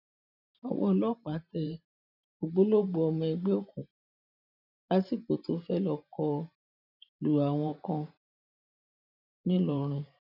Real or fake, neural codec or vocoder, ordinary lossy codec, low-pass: fake; vocoder, 44.1 kHz, 128 mel bands every 512 samples, BigVGAN v2; none; 5.4 kHz